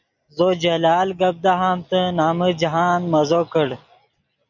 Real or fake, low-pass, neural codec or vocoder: real; 7.2 kHz; none